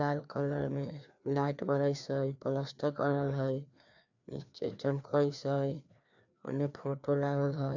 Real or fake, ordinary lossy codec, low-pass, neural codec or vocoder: fake; none; 7.2 kHz; codec, 16 kHz, 2 kbps, FreqCodec, larger model